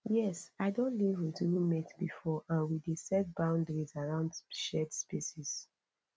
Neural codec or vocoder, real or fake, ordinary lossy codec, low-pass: none; real; none; none